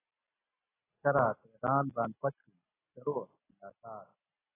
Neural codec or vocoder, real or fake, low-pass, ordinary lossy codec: none; real; 3.6 kHz; AAC, 16 kbps